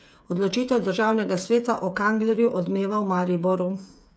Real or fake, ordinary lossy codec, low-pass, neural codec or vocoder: fake; none; none; codec, 16 kHz, 8 kbps, FreqCodec, smaller model